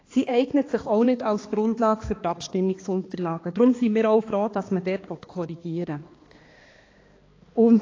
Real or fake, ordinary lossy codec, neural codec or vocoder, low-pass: fake; AAC, 32 kbps; codec, 16 kHz, 2 kbps, X-Codec, HuBERT features, trained on balanced general audio; 7.2 kHz